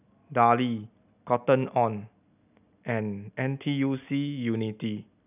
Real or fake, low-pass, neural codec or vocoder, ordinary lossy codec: real; 3.6 kHz; none; none